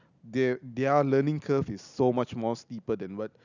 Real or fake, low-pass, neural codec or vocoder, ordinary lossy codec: real; 7.2 kHz; none; none